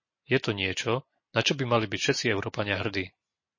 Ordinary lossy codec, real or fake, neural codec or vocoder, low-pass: MP3, 32 kbps; real; none; 7.2 kHz